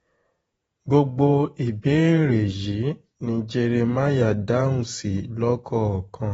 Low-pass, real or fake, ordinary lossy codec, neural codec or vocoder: 19.8 kHz; fake; AAC, 24 kbps; vocoder, 48 kHz, 128 mel bands, Vocos